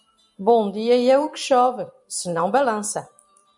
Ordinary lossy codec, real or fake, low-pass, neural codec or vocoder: MP3, 64 kbps; fake; 10.8 kHz; vocoder, 44.1 kHz, 128 mel bands every 256 samples, BigVGAN v2